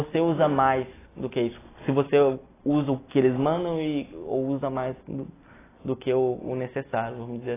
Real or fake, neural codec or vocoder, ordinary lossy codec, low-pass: real; none; AAC, 16 kbps; 3.6 kHz